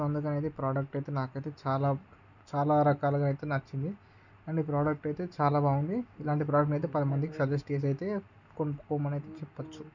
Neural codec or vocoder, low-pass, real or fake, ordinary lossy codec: none; 7.2 kHz; real; none